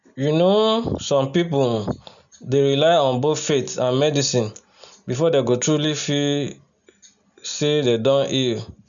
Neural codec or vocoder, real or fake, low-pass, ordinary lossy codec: none; real; 7.2 kHz; none